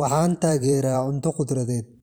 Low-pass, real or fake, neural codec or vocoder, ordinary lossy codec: none; fake; vocoder, 44.1 kHz, 128 mel bands every 512 samples, BigVGAN v2; none